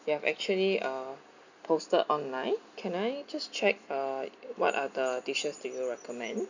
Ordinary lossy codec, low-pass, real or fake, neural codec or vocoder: none; 7.2 kHz; real; none